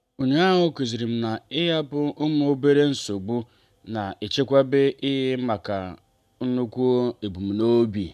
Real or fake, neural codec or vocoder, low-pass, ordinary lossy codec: real; none; 14.4 kHz; none